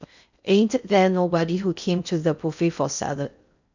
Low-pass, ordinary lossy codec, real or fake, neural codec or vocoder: 7.2 kHz; none; fake; codec, 16 kHz in and 24 kHz out, 0.6 kbps, FocalCodec, streaming, 4096 codes